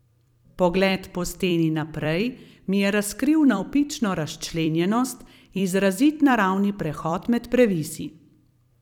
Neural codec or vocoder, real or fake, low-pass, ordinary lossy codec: vocoder, 44.1 kHz, 128 mel bands every 256 samples, BigVGAN v2; fake; 19.8 kHz; none